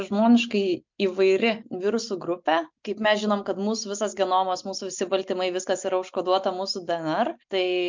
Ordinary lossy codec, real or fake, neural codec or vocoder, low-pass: MP3, 64 kbps; real; none; 7.2 kHz